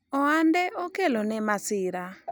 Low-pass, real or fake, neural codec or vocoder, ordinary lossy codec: none; real; none; none